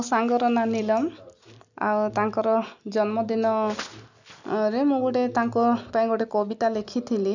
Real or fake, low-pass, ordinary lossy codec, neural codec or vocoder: real; 7.2 kHz; none; none